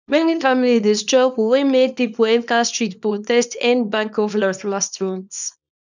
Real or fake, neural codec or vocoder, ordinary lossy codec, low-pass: fake; codec, 24 kHz, 0.9 kbps, WavTokenizer, small release; none; 7.2 kHz